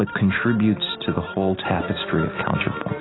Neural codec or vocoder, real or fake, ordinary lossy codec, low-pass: none; real; AAC, 16 kbps; 7.2 kHz